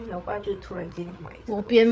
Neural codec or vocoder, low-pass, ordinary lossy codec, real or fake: codec, 16 kHz, 8 kbps, FreqCodec, larger model; none; none; fake